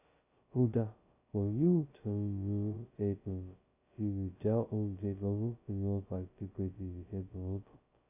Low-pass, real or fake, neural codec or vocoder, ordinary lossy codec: 3.6 kHz; fake; codec, 16 kHz, 0.2 kbps, FocalCodec; AAC, 32 kbps